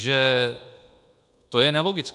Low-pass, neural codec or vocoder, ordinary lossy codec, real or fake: 10.8 kHz; codec, 24 kHz, 1.2 kbps, DualCodec; AAC, 64 kbps; fake